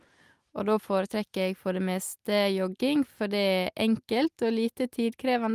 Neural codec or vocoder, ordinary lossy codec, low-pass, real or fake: none; Opus, 32 kbps; 14.4 kHz; real